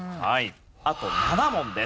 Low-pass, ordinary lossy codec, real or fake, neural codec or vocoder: none; none; real; none